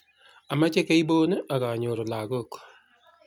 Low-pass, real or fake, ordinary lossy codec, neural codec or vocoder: 19.8 kHz; real; none; none